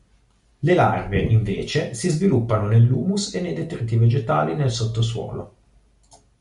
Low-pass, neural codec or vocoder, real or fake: 10.8 kHz; none; real